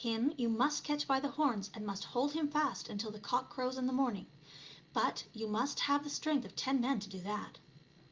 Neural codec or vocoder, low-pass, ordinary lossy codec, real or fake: none; 7.2 kHz; Opus, 24 kbps; real